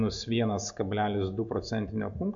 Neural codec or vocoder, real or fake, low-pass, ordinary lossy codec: none; real; 7.2 kHz; MP3, 64 kbps